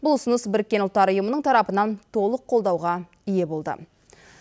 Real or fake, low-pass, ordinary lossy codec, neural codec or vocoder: real; none; none; none